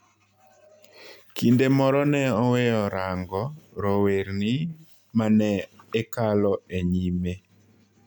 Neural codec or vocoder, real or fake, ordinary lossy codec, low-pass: none; real; none; 19.8 kHz